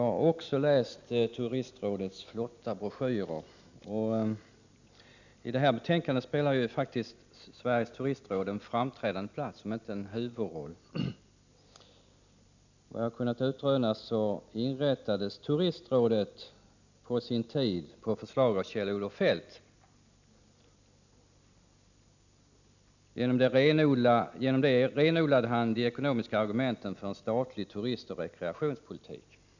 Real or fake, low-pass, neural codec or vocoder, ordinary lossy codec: real; 7.2 kHz; none; none